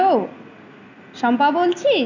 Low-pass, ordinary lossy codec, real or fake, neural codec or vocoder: 7.2 kHz; none; real; none